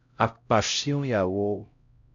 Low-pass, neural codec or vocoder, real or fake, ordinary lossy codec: 7.2 kHz; codec, 16 kHz, 0.5 kbps, X-Codec, HuBERT features, trained on LibriSpeech; fake; AAC, 64 kbps